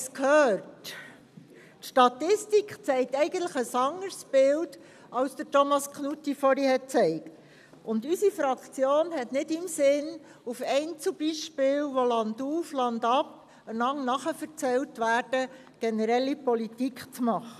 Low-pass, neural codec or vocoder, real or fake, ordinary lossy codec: 14.4 kHz; none; real; none